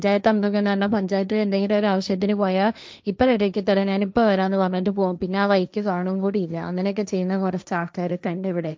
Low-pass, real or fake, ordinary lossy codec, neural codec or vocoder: none; fake; none; codec, 16 kHz, 1.1 kbps, Voila-Tokenizer